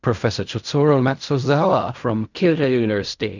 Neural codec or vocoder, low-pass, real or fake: codec, 16 kHz in and 24 kHz out, 0.4 kbps, LongCat-Audio-Codec, fine tuned four codebook decoder; 7.2 kHz; fake